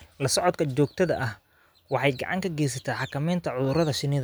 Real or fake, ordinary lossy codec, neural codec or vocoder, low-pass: real; none; none; none